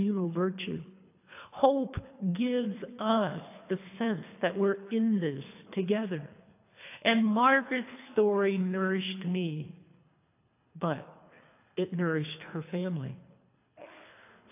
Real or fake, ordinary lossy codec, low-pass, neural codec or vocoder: fake; AAC, 24 kbps; 3.6 kHz; codec, 24 kHz, 3 kbps, HILCodec